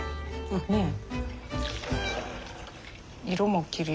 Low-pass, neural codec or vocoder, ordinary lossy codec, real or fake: none; none; none; real